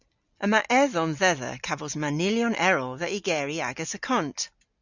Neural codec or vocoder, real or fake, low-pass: none; real; 7.2 kHz